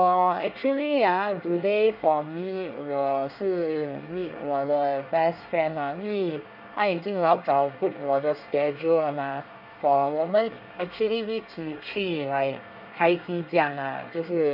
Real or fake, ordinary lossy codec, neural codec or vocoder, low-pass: fake; none; codec, 24 kHz, 1 kbps, SNAC; 5.4 kHz